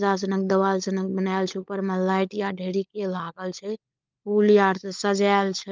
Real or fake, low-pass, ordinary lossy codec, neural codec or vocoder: fake; 7.2 kHz; Opus, 24 kbps; codec, 16 kHz, 16 kbps, FunCodec, trained on LibriTTS, 50 frames a second